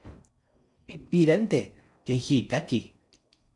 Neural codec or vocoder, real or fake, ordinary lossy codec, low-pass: codec, 16 kHz in and 24 kHz out, 0.6 kbps, FocalCodec, streaming, 4096 codes; fake; MP3, 96 kbps; 10.8 kHz